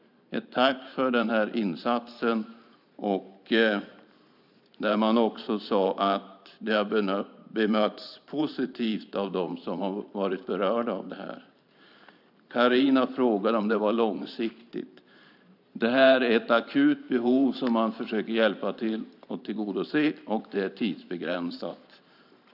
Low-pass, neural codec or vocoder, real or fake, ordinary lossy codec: 5.4 kHz; vocoder, 44.1 kHz, 128 mel bands every 512 samples, BigVGAN v2; fake; none